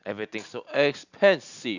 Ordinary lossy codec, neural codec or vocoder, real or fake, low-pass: none; none; real; 7.2 kHz